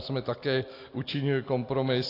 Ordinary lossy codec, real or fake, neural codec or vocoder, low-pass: AAC, 32 kbps; real; none; 5.4 kHz